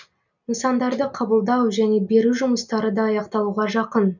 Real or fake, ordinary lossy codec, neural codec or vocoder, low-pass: real; none; none; 7.2 kHz